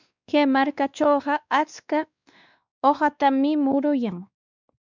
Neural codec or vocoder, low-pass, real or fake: codec, 16 kHz, 2 kbps, X-Codec, WavLM features, trained on Multilingual LibriSpeech; 7.2 kHz; fake